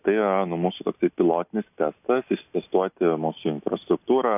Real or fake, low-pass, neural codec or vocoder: real; 3.6 kHz; none